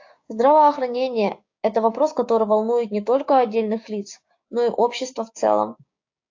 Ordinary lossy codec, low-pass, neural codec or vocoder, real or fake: AAC, 48 kbps; 7.2 kHz; codec, 16 kHz, 6 kbps, DAC; fake